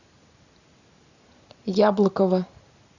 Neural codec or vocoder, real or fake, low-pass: none; real; 7.2 kHz